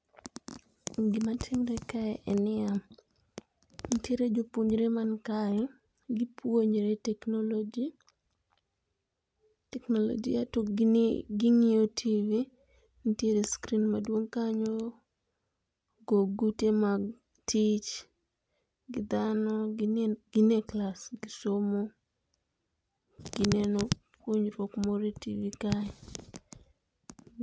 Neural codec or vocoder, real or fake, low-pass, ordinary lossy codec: none; real; none; none